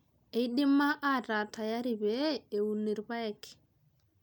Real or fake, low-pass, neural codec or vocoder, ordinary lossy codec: real; none; none; none